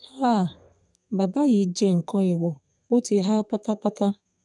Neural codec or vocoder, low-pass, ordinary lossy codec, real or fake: codec, 32 kHz, 1.9 kbps, SNAC; 10.8 kHz; none; fake